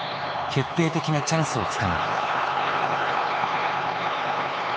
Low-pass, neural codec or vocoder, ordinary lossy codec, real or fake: none; codec, 16 kHz, 4 kbps, X-Codec, HuBERT features, trained on LibriSpeech; none; fake